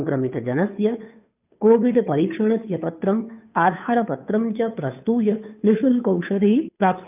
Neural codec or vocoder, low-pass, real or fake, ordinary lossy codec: codec, 16 kHz, 2 kbps, FunCodec, trained on Chinese and English, 25 frames a second; 3.6 kHz; fake; none